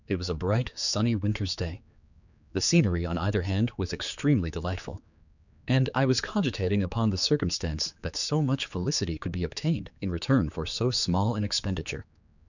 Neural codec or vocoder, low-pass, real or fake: codec, 16 kHz, 4 kbps, X-Codec, HuBERT features, trained on general audio; 7.2 kHz; fake